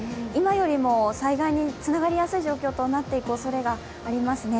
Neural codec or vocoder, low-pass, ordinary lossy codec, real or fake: none; none; none; real